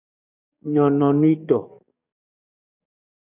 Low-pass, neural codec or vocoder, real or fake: 3.6 kHz; codec, 44.1 kHz, 7.8 kbps, DAC; fake